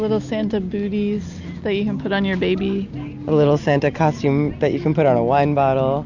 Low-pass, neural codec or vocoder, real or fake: 7.2 kHz; none; real